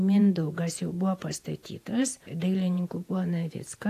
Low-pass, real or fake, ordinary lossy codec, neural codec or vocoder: 14.4 kHz; fake; AAC, 64 kbps; vocoder, 48 kHz, 128 mel bands, Vocos